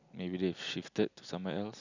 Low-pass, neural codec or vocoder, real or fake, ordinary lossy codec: 7.2 kHz; none; real; none